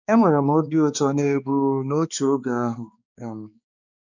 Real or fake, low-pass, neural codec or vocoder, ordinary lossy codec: fake; 7.2 kHz; codec, 16 kHz, 2 kbps, X-Codec, HuBERT features, trained on balanced general audio; none